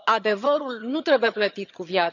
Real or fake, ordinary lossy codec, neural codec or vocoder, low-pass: fake; none; vocoder, 22.05 kHz, 80 mel bands, HiFi-GAN; 7.2 kHz